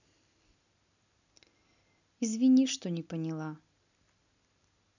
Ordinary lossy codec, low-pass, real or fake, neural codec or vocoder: none; 7.2 kHz; real; none